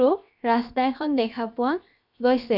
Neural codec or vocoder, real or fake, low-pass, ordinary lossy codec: codec, 16 kHz, 0.7 kbps, FocalCodec; fake; 5.4 kHz; none